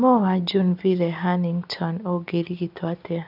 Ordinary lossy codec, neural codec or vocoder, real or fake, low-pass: none; none; real; 5.4 kHz